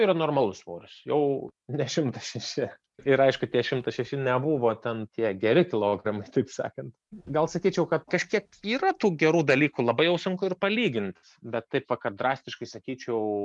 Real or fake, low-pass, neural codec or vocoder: real; 10.8 kHz; none